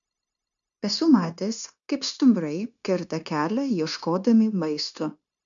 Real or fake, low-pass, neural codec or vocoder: fake; 7.2 kHz; codec, 16 kHz, 0.9 kbps, LongCat-Audio-Codec